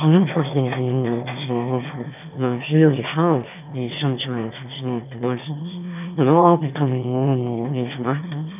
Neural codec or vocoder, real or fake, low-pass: autoencoder, 22.05 kHz, a latent of 192 numbers a frame, VITS, trained on one speaker; fake; 3.6 kHz